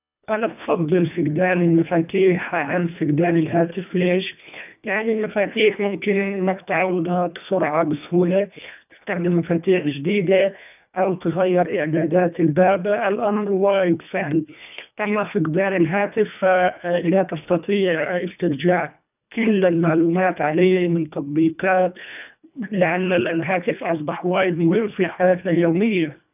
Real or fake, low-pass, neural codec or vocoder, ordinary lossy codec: fake; 3.6 kHz; codec, 24 kHz, 1.5 kbps, HILCodec; none